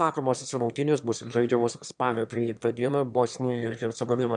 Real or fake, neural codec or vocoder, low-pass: fake; autoencoder, 22.05 kHz, a latent of 192 numbers a frame, VITS, trained on one speaker; 9.9 kHz